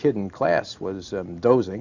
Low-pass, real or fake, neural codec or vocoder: 7.2 kHz; real; none